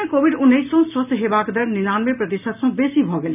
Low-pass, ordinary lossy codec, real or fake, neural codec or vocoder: 3.6 kHz; none; real; none